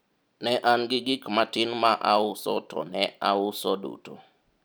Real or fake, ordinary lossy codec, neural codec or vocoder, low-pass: real; none; none; none